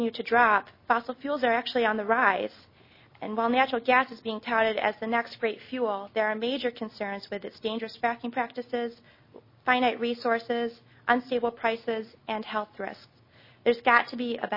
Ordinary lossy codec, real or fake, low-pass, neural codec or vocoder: MP3, 24 kbps; real; 5.4 kHz; none